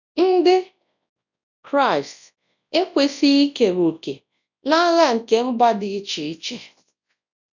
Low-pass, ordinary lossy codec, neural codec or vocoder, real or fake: 7.2 kHz; none; codec, 24 kHz, 0.9 kbps, WavTokenizer, large speech release; fake